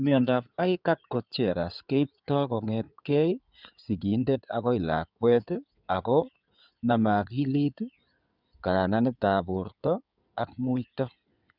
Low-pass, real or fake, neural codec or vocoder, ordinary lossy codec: 5.4 kHz; fake; codec, 16 kHz in and 24 kHz out, 2.2 kbps, FireRedTTS-2 codec; none